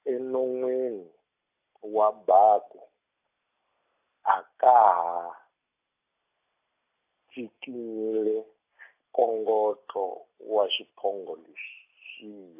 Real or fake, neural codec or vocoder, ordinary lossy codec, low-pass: real; none; AAC, 24 kbps; 3.6 kHz